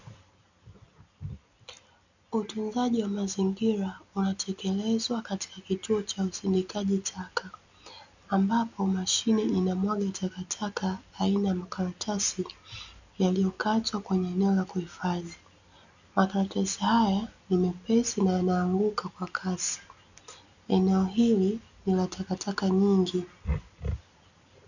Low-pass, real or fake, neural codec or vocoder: 7.2 kHz; real; none